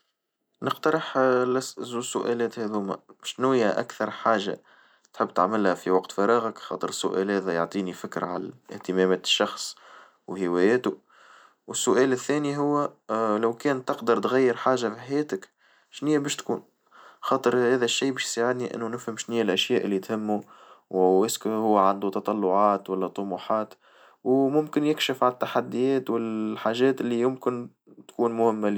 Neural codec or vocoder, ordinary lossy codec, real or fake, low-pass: none; none; real; none